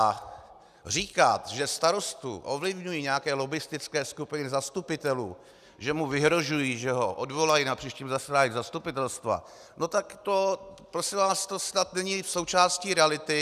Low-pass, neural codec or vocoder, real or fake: 14.4 kHz; none; real